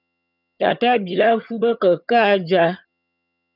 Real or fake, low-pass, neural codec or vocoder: fake; 5.4 kHz; vocoder, 22.05 kHz, 80 mel bands, HiFi-GAN